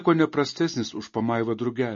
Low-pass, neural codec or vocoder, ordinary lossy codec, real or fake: 7.2 kHz; none; MP3, 32 kbps; real